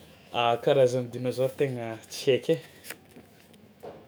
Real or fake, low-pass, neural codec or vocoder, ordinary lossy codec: fake; none; autoencoder, 48 kHz, 128 numbers a frame, DAC-VAE, trained on Japanese speech; none